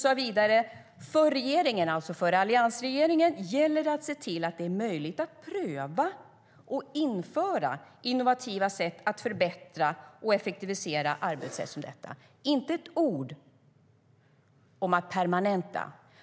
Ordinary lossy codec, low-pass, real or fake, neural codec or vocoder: none; none; real; none